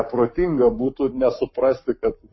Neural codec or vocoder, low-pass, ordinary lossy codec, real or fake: codec, 44.1 kHz, 7.8 kbps, Pupu-Codec; 7.2 kHz; MP3, 24 kbps; fake